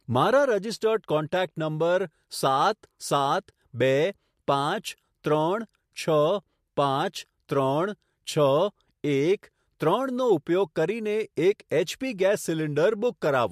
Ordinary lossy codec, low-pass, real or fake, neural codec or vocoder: MP3, 64 kbps; 14.4 kHz; real; none